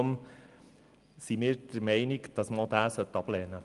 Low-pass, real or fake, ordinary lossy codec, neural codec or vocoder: 10.8 kHz; real; Opus, 32 kbps; none